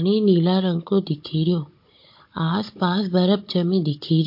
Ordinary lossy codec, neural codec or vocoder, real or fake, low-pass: MP3, 32 kbps; codec, 16 kHz, 16 kbps, FunCodec, trained on Chinese and English, 50 frames a second; fake; 5.4 kHz